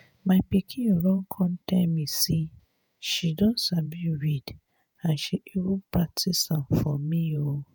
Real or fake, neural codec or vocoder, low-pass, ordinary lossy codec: fake; vocoder, 48 kHz, 128 mel bands, Vocos; none; none